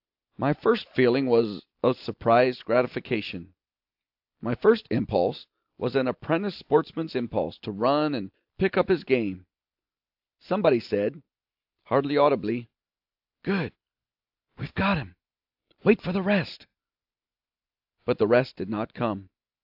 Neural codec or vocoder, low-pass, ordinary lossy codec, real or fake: none; 5.4 kHz; AAC, 48 kbps; real